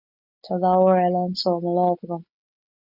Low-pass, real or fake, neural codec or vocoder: 5.4 kHz; real; none